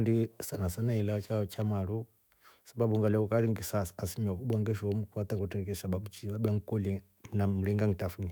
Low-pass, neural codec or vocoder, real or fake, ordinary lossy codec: none; autoencoder, 48 kHz, 128 numbers a frame, DAC-VAE, trained on Japanese speech; fake; none